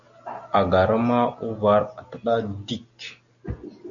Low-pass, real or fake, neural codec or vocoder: 7.2 kHz; real; none